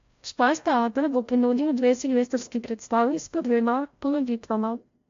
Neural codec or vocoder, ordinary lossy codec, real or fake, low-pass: codec, 16 kHz, 0.5 kbps, FreqCodec, larger model; AAC, 48 kbps; fake; 7.2 kHz